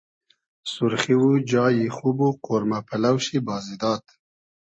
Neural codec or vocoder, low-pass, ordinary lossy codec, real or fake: none; 9.9 kHz; MP3, 32 kbps; real